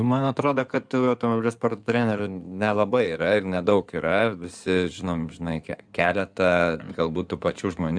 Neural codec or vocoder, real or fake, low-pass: codec, 16 kHz in and 24 kHz out, 2.2 kbps, FireRedTTS-2 codec; fake; 9.9 kHz